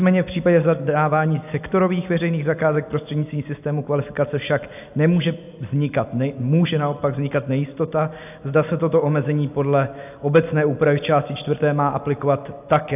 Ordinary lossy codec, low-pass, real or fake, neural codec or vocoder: AAC, 32 kbps; 3.6 kHz; real; none